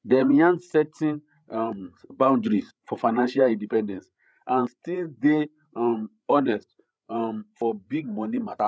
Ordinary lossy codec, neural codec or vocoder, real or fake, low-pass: none; codec, 16 kHz, 8 kbps, FreqCodec, larger model; fake; none